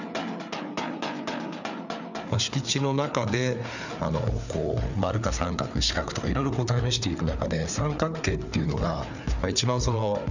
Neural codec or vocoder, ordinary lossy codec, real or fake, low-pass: codec, 16 kHz, 4 kbps, FreqCodec, larger model; none; fake; 7.2 kHz